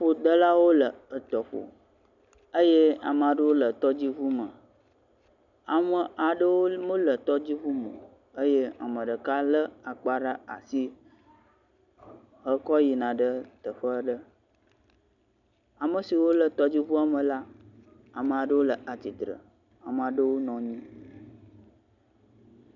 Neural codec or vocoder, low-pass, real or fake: none; 7.2 kHz; real